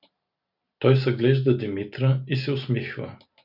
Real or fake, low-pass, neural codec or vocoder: real; 5.4 kHz; none